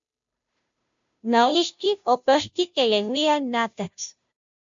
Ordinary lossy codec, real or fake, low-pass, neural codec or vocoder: MP3, 64 kbps; fake; 7.2 kHz; codec, 16 kHz, 0.5 kbps, FunCodec, trained on Chinese and English, 25 frames a second